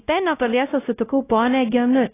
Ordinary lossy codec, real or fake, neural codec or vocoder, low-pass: AAC, 16 kbps; fake; codec, 16 kHz, 0.5 kbps, X-Codec, WavLM features, trained on Multilingual LibriSpeech; 3.6 kHz